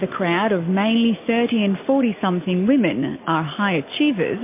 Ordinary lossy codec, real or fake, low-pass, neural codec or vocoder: MP3, 24 kbps; real; 3.6 kHz; none